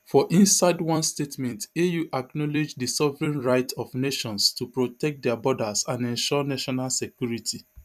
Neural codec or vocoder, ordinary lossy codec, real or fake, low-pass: vocoder, 44.1 kHz, 128 mel bands every 256 samples, BigVGAN v2; none; fake; 14.4 kHz